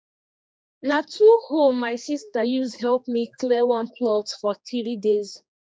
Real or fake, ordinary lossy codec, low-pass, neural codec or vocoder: fake; none; none; codec, 16 kHz, 2 kbps, X-Codec, HuBERT features, trained on general audio